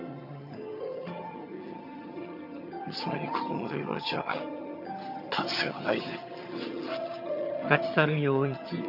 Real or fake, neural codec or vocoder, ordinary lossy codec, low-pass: fake; vocoder, 22.05 kHz, 80 mel bands, HiFi-GAN; none; 5.4 kHz